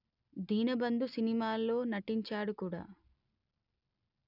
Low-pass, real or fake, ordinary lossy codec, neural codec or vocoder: 5.4 kHz; real; none; none